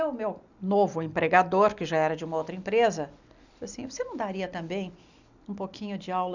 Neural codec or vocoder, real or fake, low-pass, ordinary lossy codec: none; real; 7.2 kHz; none